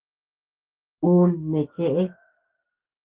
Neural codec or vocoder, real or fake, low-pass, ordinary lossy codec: codec, 16 kHz, 6 kbps, DAC; fake; 3.6 kHz; Opus, 16 kbps